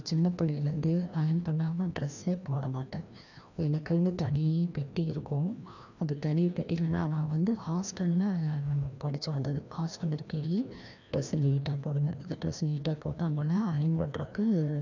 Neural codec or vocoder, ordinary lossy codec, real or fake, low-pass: codec, 16 kHz, 1 kbps, FreqCodec, larger model; none; fake; 7.2 kHz